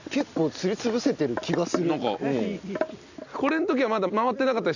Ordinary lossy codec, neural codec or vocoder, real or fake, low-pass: none; none; real; 7.2 kHz